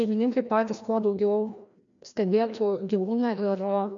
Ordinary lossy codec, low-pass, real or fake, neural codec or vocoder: AAC, 64 kbps; 7.2 kHz; fake; codec, 16 kHz, 1 kbps, FreqCodec, larger model